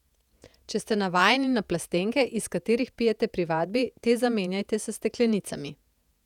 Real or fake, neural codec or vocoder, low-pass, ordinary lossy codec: fake; vocoder, 48 kHz, 128 mel bands, Vocos; 19.8 kHz; none